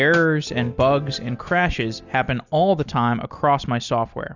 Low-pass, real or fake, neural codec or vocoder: 7.2 kHz; real; none